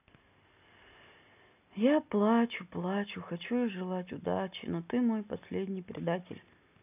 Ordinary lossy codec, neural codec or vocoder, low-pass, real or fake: none; none; 3.6 kHz; real